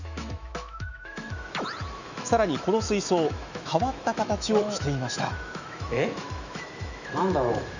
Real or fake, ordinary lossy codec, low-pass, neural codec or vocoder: fake; none; 7.2 kHz; autoencoder, 48 kHz, 128 numbers a frame, DAC-VAE, trained on Japanese speech